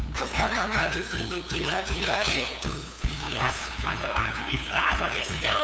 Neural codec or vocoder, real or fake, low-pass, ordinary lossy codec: codec, 16 kHz, 2 kbps, FunCodec, trained on LibriTTS, 25 frames a second; fake; none; none